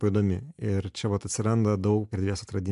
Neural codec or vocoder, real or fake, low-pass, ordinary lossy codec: none; real; 10.8 kHz; MP3, 64 kbps